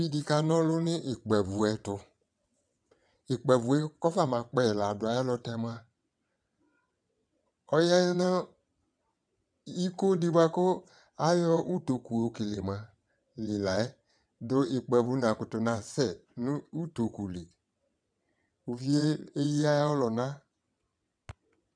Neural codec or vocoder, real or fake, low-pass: vocoder, 22.05 kHz, 80 mel bands, WaveNeXt; fake; 9.9 kHz